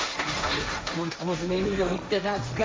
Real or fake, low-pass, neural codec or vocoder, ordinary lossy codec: fake; none; codec, 16 kHz, 1.1 kbps, Voila-Tokenizer; none